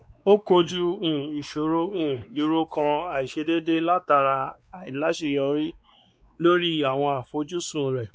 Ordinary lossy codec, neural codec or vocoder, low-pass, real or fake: none; codec, 16 kHz, 2 kbps, X-Codec, WavLM features, trained on Multilingual LibriSpeech; none; fake